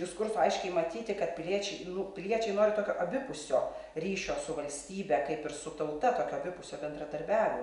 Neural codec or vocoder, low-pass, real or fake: none; 10.8 kHz; real